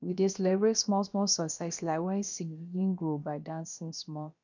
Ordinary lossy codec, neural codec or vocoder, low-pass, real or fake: none; codec, 16 kHz, about 1 kbps, DyCAST, with the encoder's durations; 7.2 kHz; fake